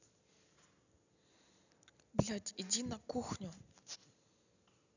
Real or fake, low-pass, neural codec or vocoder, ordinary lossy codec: real; 7.2 kHz; none; none